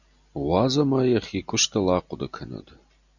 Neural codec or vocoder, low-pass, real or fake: none; 7.2 kHz; real